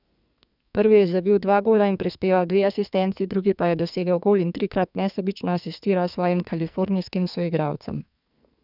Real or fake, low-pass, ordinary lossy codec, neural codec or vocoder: fake; 5.4 kHz; none; codec, 44.1 kHz, 2.6 kbps, SNAC